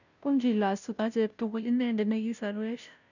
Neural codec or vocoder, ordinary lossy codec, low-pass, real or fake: codec, 16 kHz, 0.5 kbps, FunCodec, trained on Chinese and English, 25 frames a second; none; 7.2 kHz; fake